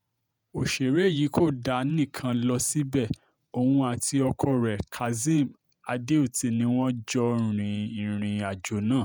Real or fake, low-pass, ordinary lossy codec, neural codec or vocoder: fake; none; none; vocoder, 48 kHz, 128 mel bands, Vocos